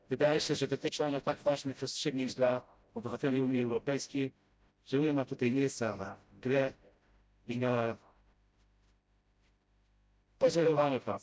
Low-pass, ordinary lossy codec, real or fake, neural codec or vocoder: none; none; fake; codec, 16 kHz, 0.5 kbps, FreqCodec, smaller model